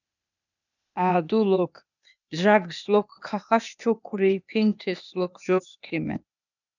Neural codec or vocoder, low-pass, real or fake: codec, 16 kHz, 0.8 kbps, ZipCodec; 7.2 kHz; fake